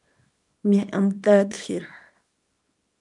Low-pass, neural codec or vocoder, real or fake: 10.8 kHz; codec, 24 kHz, 0.9 kbps, WavTokenizer, small release; fake